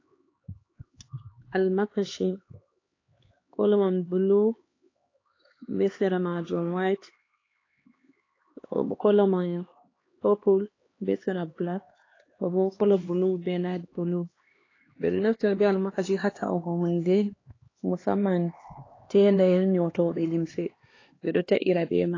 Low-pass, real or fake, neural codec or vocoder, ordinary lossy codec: 7.2 kHz; fake; codec, 16 kHz, 2 kbps, X-Codec, HuBERT features, trained on LibriSpeech; AAC, 32 kbps